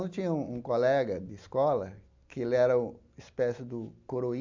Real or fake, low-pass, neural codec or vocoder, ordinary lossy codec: real; 7.2 kHz; none; MP3, 64 kbps